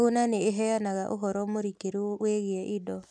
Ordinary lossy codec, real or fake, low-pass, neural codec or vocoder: none; real; none; none